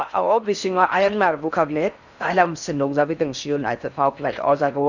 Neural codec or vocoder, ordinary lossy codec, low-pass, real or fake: codec, 16 kHz in and 24 kHz out, 0.6 kbps, FocalCodec, streaming, 4096 codes; none; 7.2 kHz; fake